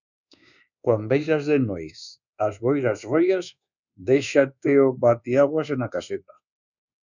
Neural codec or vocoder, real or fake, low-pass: codec, 24 kHz, 1.2 kbps, DualCodec; fake; 7.2 kHz